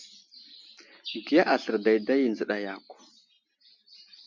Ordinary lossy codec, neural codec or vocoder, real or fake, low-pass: MP3, 48 kbps; none; real; 7.2 kHz